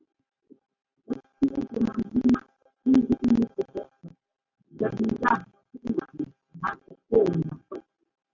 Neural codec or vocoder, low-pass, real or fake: none; 7.2 kHz; real